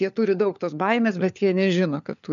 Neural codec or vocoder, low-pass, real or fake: codec, 16 kHz, 4 kbps, FunCodec, trained on LibriTTS, 50 frames a second; 7.2 kHz; fake